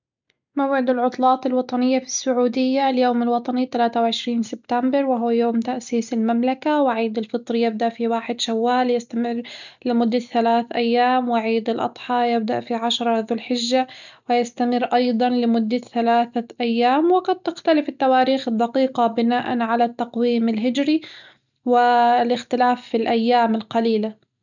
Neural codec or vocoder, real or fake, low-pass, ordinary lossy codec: none; real; 7.2 kHz; none